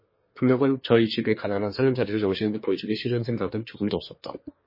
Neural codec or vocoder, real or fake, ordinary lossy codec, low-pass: codec, 24 kHz, 1 kbps, SNAC; fake; MP3, 24 kbps; 5.4 kHz